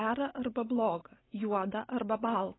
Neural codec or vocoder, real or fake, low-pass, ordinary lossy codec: none; real; 7.2 kHz; AAC, 16 kbps